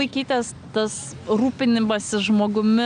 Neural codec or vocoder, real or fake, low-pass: none; real; 9.9 kHz